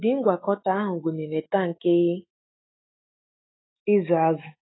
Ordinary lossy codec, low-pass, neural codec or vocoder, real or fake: AAC, 16 kbps; 7.2 kHz; autoencoder, 48 kHz, 128 numbers a frame, DAC-VAE, trained on Japanese speech; fake